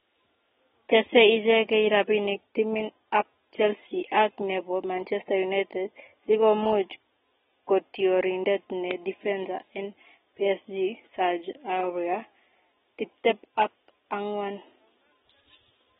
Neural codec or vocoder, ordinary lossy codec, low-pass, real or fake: none; AAC, 16 kbps; 7.2 kHz; real